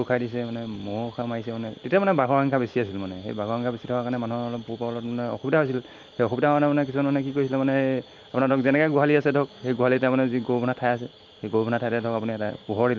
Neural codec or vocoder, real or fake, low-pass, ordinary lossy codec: none; real; 7.2 kHz; Opus, 24 kbps